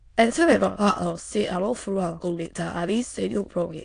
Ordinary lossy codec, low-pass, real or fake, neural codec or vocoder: AAC, 48 kbps; 9.9 kHz; fake; autoencoder, 22.05 kHz, a latent of 192 numbers a frame, VITS, trained on many speakers